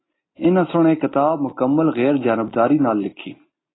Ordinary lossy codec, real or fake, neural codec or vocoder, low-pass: AAC, 16 kbps; real; none; 7.2 kHz